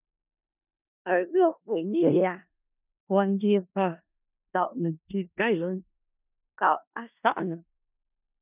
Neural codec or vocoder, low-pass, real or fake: codec, 16 kHz in and 24 kHz out, 0.4 kbps, LongCat-Audio-Codec, four codebook decoder; 3.6 kHz; fake